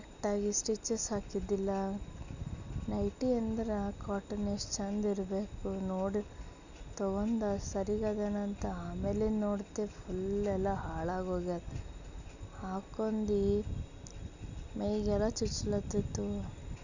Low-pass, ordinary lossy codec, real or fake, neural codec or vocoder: 7.2 kHz; none; real; none